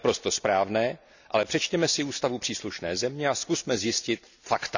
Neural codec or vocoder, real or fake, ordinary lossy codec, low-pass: none; real; none; 7.2 kHz